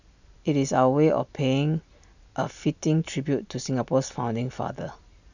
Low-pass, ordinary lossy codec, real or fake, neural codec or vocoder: 7.2 kHz; none; real; none